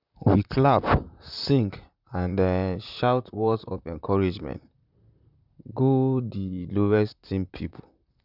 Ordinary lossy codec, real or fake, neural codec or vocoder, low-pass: none; fake; vocoder, 44.1 kHz, 128 mel bands, Pupu-Vocoder; 5.4 kHz